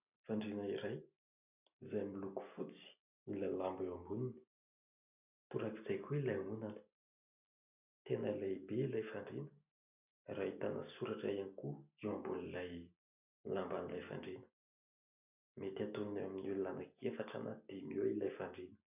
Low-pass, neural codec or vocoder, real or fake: 3.6 kHz; none; real